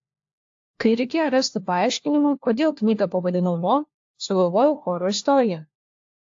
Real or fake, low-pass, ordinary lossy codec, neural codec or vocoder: fake; 7.2 kHz; AAC, 48 kbps; codec, 16 kHz, 1 kbps, FunCodec, trained on LibriTTS, 50 frames a second